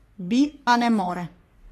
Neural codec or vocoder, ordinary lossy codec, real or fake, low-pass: codec, 44.1 kHz, 3.4 kbps, Pupu-Codec; AAC, 48 kbps; fake; 14.4 kHz